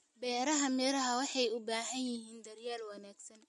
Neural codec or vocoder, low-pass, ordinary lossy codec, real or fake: none; 14.4 kHz; MP3, 48 kbps; real